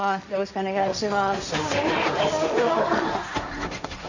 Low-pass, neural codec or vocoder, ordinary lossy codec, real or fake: 7.2 kHz; codec, 16 kHz, 1.1 kbps, Voila-Tokenizer; none; fake